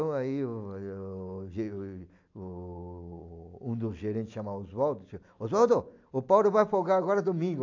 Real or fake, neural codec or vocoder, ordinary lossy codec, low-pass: real; none; AAC, 48 kbps; 7.2 kHz